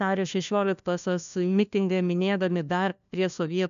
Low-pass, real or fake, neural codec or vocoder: 7.2 kHz; fake; codec, 16 kHz, 1 kbps, FunCodec, trained on LibriTTS, 50 frames a second